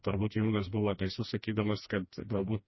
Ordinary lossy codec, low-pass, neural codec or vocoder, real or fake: MP3, 24 kbps; 7.2 kHz; codec, 16 kHz, 1 kbps, FreqCodec, smaller model; fake